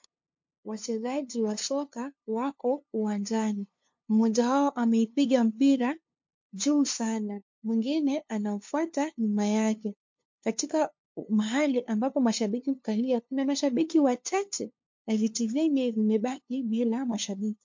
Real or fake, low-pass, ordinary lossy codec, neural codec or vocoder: fake; 7.2 kHz; MP3, 48 kbps; codec, 16 kHz, 2 kbps, FunCodec, trained on LibriTTS, 25 frames a second